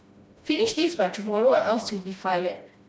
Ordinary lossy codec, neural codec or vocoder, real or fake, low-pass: none; codec, 16 kHz, 1 kbps, FreqCodec, smaller model; fake; none